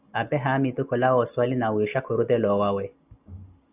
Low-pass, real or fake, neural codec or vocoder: 3.6 kHz; real; none